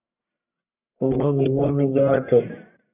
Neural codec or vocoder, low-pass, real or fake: codec, 44.1 kHz, 1.7 kbps, Pupu-Codec; 3.6 kHz; fake